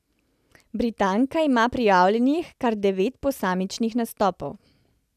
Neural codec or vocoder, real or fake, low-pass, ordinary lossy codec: none; real; 14.4 kHz; none